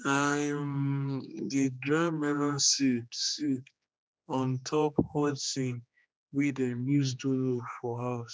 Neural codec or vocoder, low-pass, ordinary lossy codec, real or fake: codec, 16 kHz, 2 kbps, X-Codec, HuBERT features, trained on general audio; none; none; fake